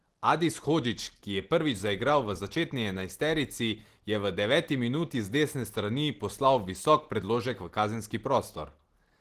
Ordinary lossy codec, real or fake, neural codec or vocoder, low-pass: Opus, 16 kbps; real; none; 14.4 kHz